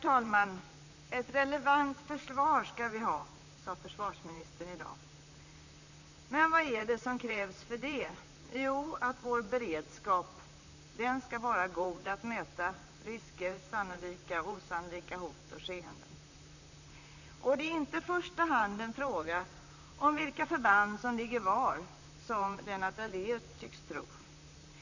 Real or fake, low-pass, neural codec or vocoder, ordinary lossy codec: fake; 7.2 kHz; vocoder, 44.1 kHz, 128 mel bands, Pupu-Vocoder; none